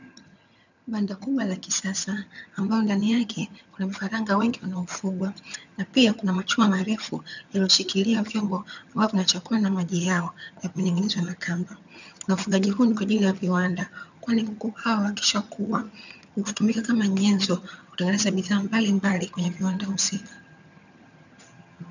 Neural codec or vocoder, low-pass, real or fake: vocoder, 22.05 kHz, 80 mel bands, HiFi-GAN; 7.2 kHz; fake